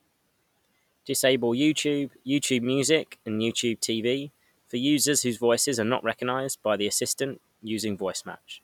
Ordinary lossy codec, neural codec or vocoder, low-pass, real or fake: none; none; 19.8 kHz; real